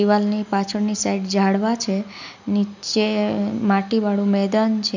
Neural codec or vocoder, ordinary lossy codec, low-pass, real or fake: none; none; 7.2 kHz; real